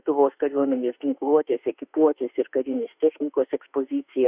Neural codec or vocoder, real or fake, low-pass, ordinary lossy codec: autoencoder, 48 kHz, 32 numbers a frame, DAC-VAE, trained on Japanese speech; fake; 3.6 kHz; Opus, 64 kbps